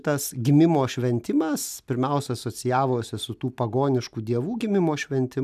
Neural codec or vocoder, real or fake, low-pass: none; real; 14.4 kHz